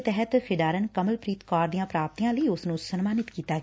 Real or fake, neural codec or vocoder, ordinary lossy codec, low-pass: real; none; none; none